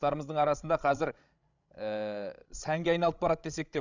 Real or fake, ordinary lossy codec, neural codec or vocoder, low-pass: fake; none; codec, 16 kHz, 16 kbps, FreqCodec, larger model; 7.2 kHz